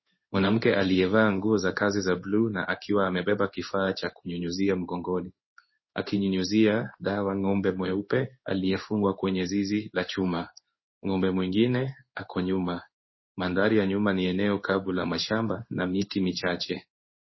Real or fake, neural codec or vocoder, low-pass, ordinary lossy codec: fake; codec, 16 kHz in and 24 kHz out, 1 kbps, XY-Tokenizer; 7.2 kHz; MP3, 24 kbps